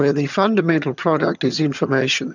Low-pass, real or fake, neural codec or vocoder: 7.2 kHz; fake; vocoder, 22.05 kHz, 80 mel bands, HiFi-GAN